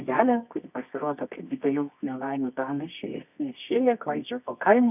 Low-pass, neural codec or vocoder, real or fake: 3.6 kHz; codec, 24 kHz, 0.9 kbps, WavTokenizer, medium music audio release; fake